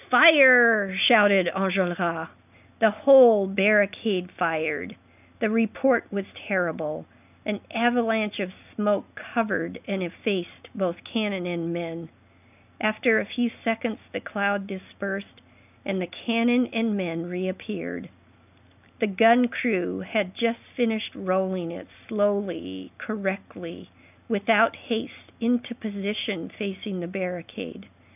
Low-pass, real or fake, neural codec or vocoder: 3.6 kHz; real; none